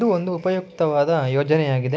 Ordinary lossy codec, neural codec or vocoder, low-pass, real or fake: none; none; none; real